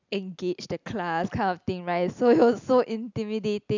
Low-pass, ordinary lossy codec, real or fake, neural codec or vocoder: 7.2 kHz; none; real; none